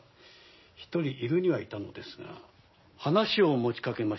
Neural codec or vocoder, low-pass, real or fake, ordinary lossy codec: none; 7.2 kHz; real; MP3, 24 kbps